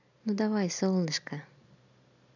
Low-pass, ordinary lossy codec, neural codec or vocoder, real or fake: 7.2 kHz; none; none; real